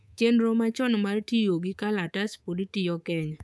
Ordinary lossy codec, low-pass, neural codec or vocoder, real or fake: none; none; codec, 24 kHz, 3.1 kbps, DualCodec; fake